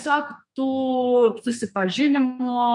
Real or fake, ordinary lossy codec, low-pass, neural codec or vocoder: fake; MP3, 48 kbps; 10.8 kHz; codec, 32 kHz, 1.9 kbps, SNAC